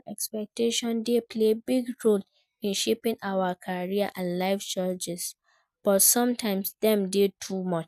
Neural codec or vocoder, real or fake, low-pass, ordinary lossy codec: none; real; 14.4 kHz; none